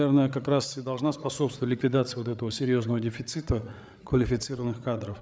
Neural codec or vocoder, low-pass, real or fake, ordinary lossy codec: codec, 16 kHz, 8 kbps, FreqCodec, larger model; none; fake; none